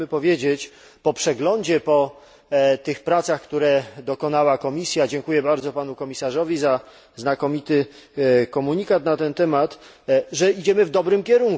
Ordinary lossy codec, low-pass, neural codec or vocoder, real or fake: none; none; none; real